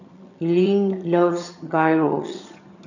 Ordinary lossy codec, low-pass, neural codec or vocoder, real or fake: none; 7.2 kHz; vocoder, 22.05 kHz, 80 mel bands, HiFi-GAN; fake